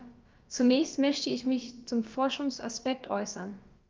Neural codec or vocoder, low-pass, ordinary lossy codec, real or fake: codec, 16 kHz, about 1 kbps, DyCAST, with the encoder's durations; 7.2 kHz; Opus, 24 kbps; fake